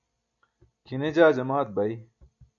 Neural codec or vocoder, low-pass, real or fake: none; 7.2 kHz; real